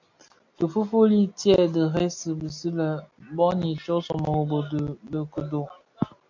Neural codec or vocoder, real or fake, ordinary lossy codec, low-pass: none; real; MP3, 48 kbps; 7.2 kHz